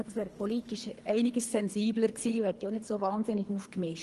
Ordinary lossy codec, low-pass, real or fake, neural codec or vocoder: Opus, 24 kbps; 10.8 kHz; fake; codec, 24 kHz, 3 kbps, HILCodec